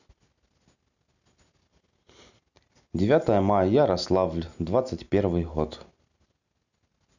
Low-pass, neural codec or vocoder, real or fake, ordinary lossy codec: 7.2 kHz; none; real; none